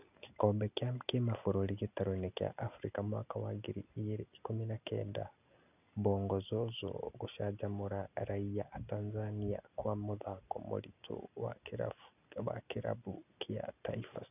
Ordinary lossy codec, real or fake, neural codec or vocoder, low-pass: none; real; none; 3.6 kHz